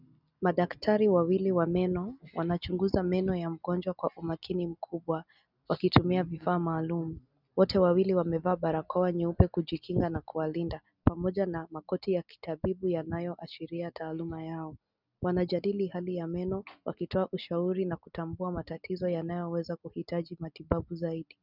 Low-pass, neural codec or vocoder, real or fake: 5.4 kHz; none; real